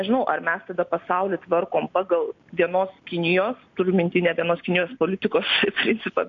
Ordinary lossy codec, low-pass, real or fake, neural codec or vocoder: AAC, 48 kbps; 7.2 kHz; real; none